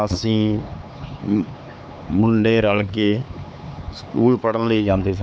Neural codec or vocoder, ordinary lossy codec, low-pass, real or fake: codec, 16 kHz, 4 kbps, X-Codec, HuBERT features, trained on LibriSpeech; none; none; fake